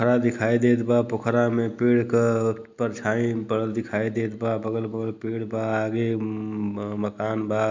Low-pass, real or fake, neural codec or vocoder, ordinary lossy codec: 7.2 kHz; real; none; MP3, 64 kbps